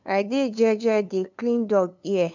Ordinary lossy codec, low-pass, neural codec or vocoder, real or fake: none; 7.2 kHz; codec, 16 kHz, 2 kbps, FunCodec, trained on LibriTTS, 25 frames a second; fake